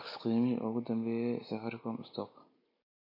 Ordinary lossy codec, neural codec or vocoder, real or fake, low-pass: MP3, 32 kbps; none; real; 5.4 kHz